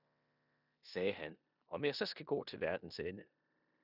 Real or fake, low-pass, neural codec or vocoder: fake; 5.4 kHz; codec, 16 kHz in and 24 kHz out, 0.9 kbps, LongCat-Audio-Codec, four codebook decoder